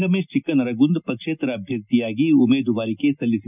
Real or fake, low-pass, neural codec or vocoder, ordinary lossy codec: real; 3.6 kHz; none; none